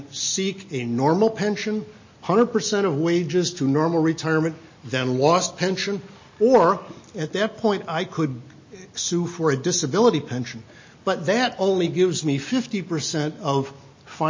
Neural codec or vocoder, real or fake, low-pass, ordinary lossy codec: none; real; 7.2 kHz; MP3, 32 kbps